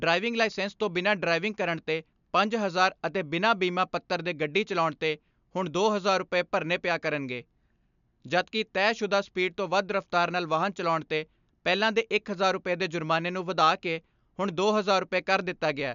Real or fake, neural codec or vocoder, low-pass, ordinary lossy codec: real; none; 7.2 kHz; none